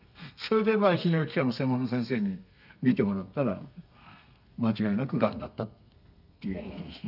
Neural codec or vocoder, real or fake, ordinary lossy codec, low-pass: codec, 32 kHz, 1.9 kbps, SNAC; fake; none; 5.4 kHz